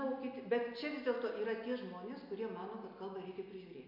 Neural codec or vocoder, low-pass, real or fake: none; 5.4 kHz; real